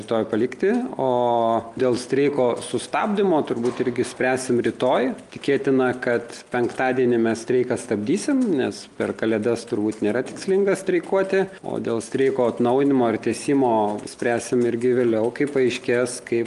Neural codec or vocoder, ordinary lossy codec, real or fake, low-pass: none; Opus, 32 kbps; real; 10.8 kHz